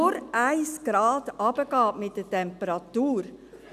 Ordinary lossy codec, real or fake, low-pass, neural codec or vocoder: none; real; 14.4 kHz; none